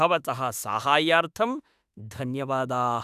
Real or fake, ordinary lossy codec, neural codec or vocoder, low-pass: fake; none; autoencoder, 48 kHz, 32 numbers a frame, DAC-VAE, trained on Japanese speech; 14.4 kHz